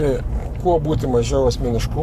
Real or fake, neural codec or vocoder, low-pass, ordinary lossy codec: fake; codec, 44.1 kHz, 7.8 kbps, Pupu-Codec; 14.4 kHz; AAC, 96 kbps